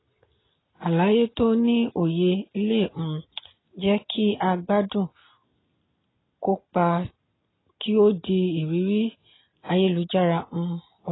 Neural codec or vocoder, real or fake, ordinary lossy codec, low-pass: none; real; AAC, 16 kbps; 7.2 kHz